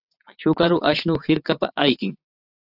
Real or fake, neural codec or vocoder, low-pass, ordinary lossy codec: fake; vocoder, 22.05 kHz, 80 mel bands, WaveNeXt; 5.4 kHz; AAC, 48 kbps